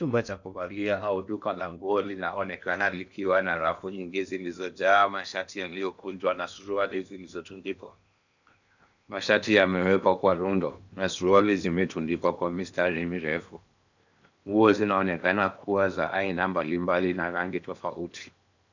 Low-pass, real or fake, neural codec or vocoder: 7.2 kHz; fake; codec, 16 kHz in and 24 kHz out, 0.8 kbps, FocalCodec, streaming, 65536 codes